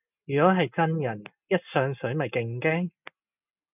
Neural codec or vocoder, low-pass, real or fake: none; 3.6 kHz; real